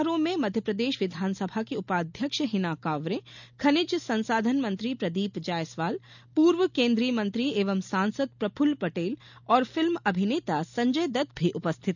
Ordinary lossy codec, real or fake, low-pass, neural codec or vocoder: none; real; 7.2 kHz; none